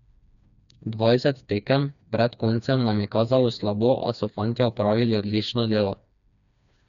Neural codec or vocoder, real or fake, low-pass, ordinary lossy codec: codec, 16 kHz, 2 kbps, FreqCodec, smaller model; fake; 7.2 kHz; none